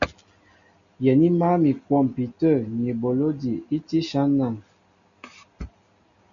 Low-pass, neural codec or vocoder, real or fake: 7.2 kHz; none; real